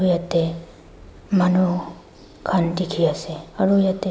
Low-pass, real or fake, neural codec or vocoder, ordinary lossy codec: none; real; none; none